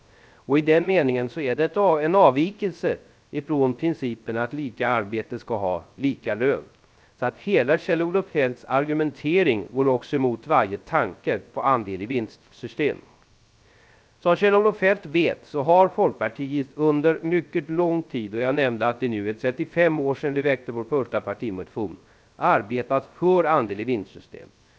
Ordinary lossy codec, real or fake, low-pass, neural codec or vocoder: none; fake; none; codec, 16 kHz, 0.3 kbps, FocalCodec